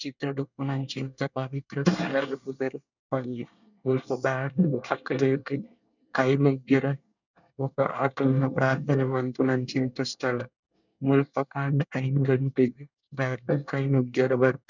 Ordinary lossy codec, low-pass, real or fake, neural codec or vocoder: none; 7.2 kHz; fake; codec, 24 kHz, 1 kbps, SNAC